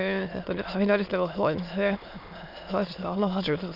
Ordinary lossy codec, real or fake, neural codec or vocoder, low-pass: none; fake; autoencoder, 22.05 kHz, a latent of 192 numbers a frame, VITS, trained on many speakers; 5.4 kHz